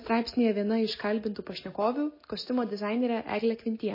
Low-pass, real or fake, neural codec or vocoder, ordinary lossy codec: 5.4 kHz; real; none; MP3, 24 kbps